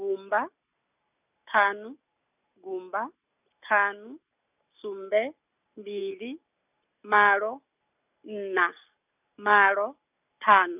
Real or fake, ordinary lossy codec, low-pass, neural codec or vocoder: real; none; 3.6 kHz; none